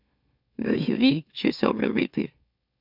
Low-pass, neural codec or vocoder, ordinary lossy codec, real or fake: 5.4 kHz; autoencoder, 44.1 kHz, a latent of 192 numbers a frame, MeloTTS; none; fake